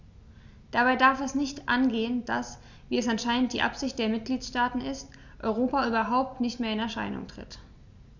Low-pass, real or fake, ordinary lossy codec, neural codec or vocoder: 7.2 kHz; real; none; none